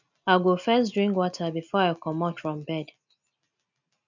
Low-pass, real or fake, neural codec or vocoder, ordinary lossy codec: 7.2 kHz; real; none; none